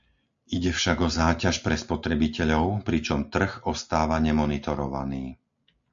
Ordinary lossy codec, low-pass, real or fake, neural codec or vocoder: MP3, 64 kbps; 7.2 kHz; real; none